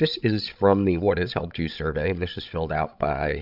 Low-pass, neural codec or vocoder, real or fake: 5.4 kHz; codec, 16 kHz, 16 kbps, FreqCodec, larger model; fake